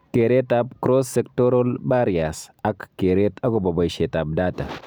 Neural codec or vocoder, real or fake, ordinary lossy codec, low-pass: none; real; none; none